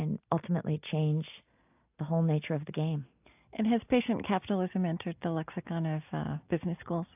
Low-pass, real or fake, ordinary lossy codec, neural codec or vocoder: 3.6 kHz; real; AAC, 32 kbps; none